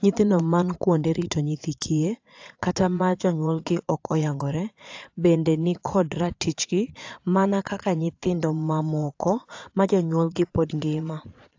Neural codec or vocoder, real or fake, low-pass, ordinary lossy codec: vocoder, 22.05 kHz, 80 mel bands, Vocos; fake; 7.2 kHz; AAC, 48 kbps